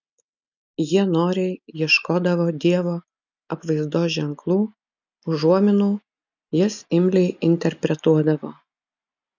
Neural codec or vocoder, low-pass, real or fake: none; 7.2 kHz; real